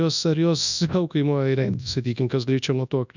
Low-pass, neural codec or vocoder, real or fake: 7.2 kHz; codec, 24 kHz, 0.9 kbps, WavTokenizer, large speech release; fake